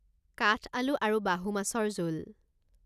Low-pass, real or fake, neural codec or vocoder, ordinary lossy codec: 14.4 kHz; real; none; none